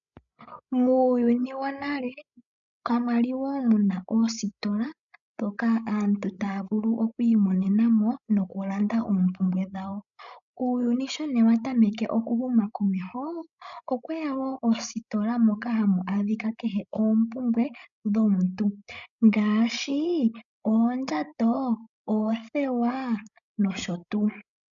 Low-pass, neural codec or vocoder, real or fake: 7.2 kHz; codec, 16 kHz, 16 kbps, FreqCodec, larger model; fake